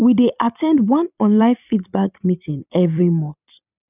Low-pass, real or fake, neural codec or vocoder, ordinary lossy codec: 3.6 kHz; fake; vocoder, 44.1 kHz, 128 mel bands every 256 samples, BigVGAN v2; none